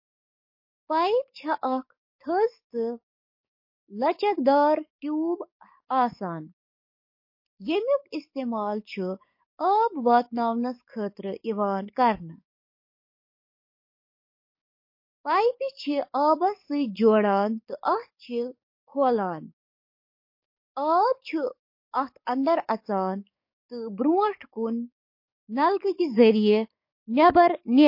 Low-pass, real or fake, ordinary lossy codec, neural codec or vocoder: 5.4 kHz; fake; MP3, 32 kbps; codec, 44.1 kHz, 7.8 kbps, DAC